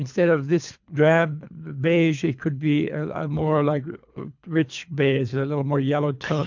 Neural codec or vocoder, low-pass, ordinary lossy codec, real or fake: codec, 24 kHz, 3 kbps, HILCodec; 7.2 kHz; MP3, 64 kbps; fake